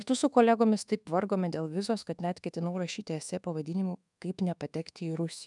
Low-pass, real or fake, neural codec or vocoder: 10.8 kHz; fake; codec, 24 kHz, 1.2 kbps, DualCodec